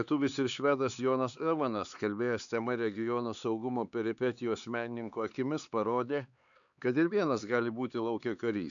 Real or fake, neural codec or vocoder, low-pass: fake; codec, 16 kHz, 4 kbps, X-Codec, HuBERT features, trained on LibriSpeech; 7.2 kHz